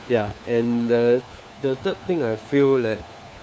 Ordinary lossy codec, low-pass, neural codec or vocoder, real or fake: none; none; codec, 16 kHz, 4 kbps, FunCodec, trained on LibriTTS, 50 frames a second; fake